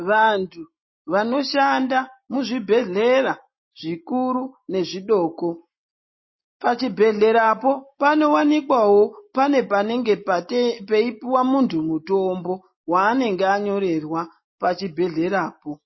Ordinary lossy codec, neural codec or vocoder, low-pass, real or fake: MP3, 24 kbps; none; 7.2 kHz; real